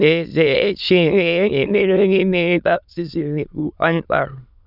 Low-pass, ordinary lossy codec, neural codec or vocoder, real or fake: 5.4 kHz; none; autoencoder, 22.05 kHz, a latent of 192 numbers a frame, VITS, trained on many speakers; fake